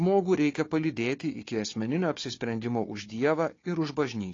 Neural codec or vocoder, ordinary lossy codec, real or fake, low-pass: codec, 16 kHz, 4 kbps, FunCodec, trained on Chinese and English, 50 frames a second; AAC, 32 kbps; fake; 7.2 kHz